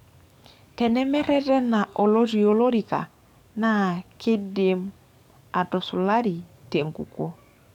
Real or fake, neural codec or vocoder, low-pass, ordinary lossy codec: fake; codec, 44.1 kHz, 7.8 kbps, DAC; 19.8 kHz; none